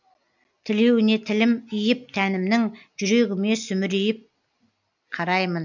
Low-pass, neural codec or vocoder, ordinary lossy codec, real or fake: 7.2 kHz; none; none; real